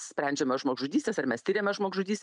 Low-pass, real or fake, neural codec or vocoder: 10.8 kHz; real; none